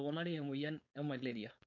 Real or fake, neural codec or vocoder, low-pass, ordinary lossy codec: fake; codec, 16 kHz, 4.8 kbps, FACodec; 7.2 kHz; none